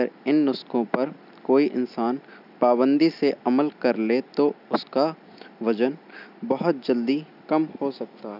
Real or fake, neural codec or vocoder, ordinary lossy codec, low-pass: real; none; none; 5.4 kHz